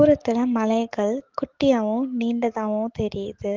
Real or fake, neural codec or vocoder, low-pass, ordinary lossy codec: fake; autoencoder, 48 kHz, 128 numbers a frame, DAC-VAE, trained on Japanese speech; 7.2 kHz; Opus, 16 kbps